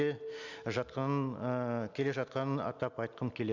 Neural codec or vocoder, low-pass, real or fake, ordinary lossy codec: none; 7.2 kHz; real; none